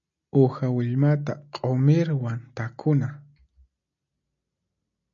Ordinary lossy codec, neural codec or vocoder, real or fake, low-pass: MP3, 96 kbps; none; real; 7.2 kHz